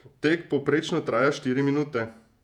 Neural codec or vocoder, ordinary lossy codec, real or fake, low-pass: vocoder, 48 kHz, 128 mel bands, Vocos; none; fake; 19.8 kHz